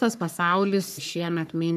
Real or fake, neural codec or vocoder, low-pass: fake; codec, 44.1 kHz, 3.4 kbps, Pupu-Codec; 14.4 kHz